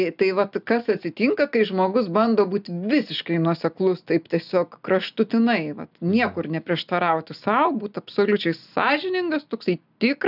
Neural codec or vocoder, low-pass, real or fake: none; 5.4 kHz; real